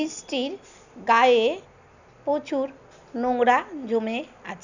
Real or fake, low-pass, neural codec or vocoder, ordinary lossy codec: real; 7.2 kHz; none; none